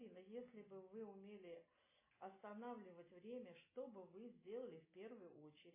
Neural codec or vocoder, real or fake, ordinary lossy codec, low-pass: none; real; MP3, 16 kbps; 3.6 kHz